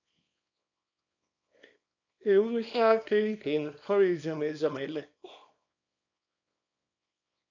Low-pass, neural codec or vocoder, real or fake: 7.2 kHz; codec, 24 kHz, 0.9 kbps, WavTokenizer, small release; fake